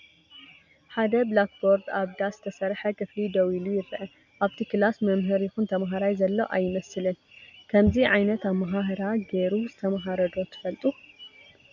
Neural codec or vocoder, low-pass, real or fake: none; 7.2 kHz; real